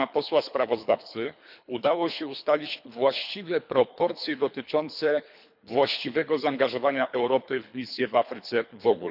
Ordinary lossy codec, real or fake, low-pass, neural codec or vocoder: none; fake; 5.4 kHz; codec, 24 kHz, 3 kbps, HILCodec